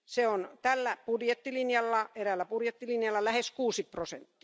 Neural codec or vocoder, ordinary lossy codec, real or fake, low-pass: none; none; real; none